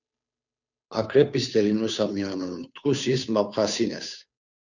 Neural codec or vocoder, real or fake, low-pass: codec, 16 kHz, 2 kbps, FunCodec, trained on Chinese and English, 25 frames a second; fake; 7.2 kHz